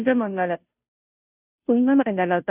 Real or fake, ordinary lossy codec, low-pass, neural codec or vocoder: fake; none; 3.6 kHz; codec, 16 kHz, 0.5 kbps, FunCodec, trained on Chinese and English, 25 frames a second